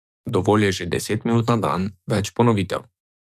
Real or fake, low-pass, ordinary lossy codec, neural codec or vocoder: fake; 14.4 kHz; none; codec, 44.1 kHz, 7.8 kbps, DAC